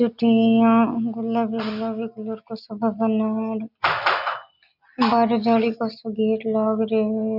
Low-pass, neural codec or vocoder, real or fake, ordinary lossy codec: 5.4 kHz; none; real; none